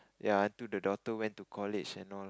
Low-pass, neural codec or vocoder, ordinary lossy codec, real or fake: none; none; none; real